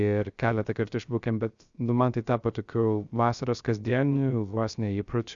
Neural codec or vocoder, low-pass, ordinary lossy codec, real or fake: codec, 16 kHz, 0.3 kbps, FocalCodec; 7.2 kHz; Opus, 64 kbps; fake